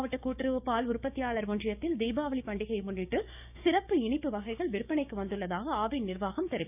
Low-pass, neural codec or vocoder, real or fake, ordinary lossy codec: 3.6 kHz; codec, 16 kHz, 6 kbps, DAC; fake; none